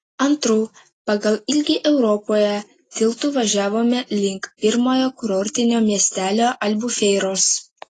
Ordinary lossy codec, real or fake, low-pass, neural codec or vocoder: AAC, 32 kbps; real; 10.8 kHz; none